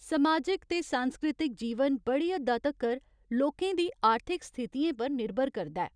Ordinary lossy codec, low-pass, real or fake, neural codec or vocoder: none; 9.9 kHz; real; none